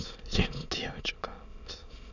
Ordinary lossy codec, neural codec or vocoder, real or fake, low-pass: none; autoencoder, 22.05 kHz, a latent of 192 numbers a frame, VITS, trained on many speakers; fake; 7.2 kHz